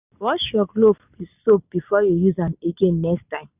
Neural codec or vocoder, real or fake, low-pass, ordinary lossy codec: none; real; 3.6 kHz; none